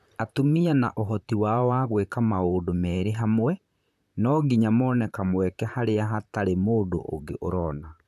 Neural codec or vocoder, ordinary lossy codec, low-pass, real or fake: vocoder, 44.1 kHz, 128 mel bands, Pupu-Vocoder; none; 14.4 kHz; fake